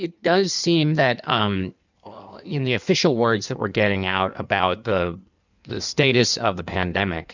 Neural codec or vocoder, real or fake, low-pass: codec, 16 kHz in and 24 kHz out, 1.1 kbps, FireRedTTS-2 codec; fake; 7.2 kHz